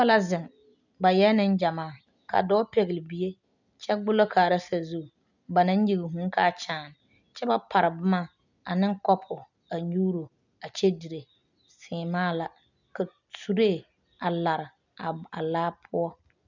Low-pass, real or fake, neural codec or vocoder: 7.2 kHz; real; none